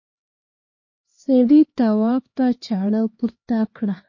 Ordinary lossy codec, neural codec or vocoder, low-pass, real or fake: MP3, 32 kbps; codec, 16 kHz, 2 kbps, X-Codec, HuBERT features, trained on LibriSpeech; 7.2 kHz; fake